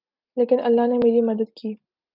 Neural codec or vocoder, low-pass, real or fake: none; 5.4 kHz; real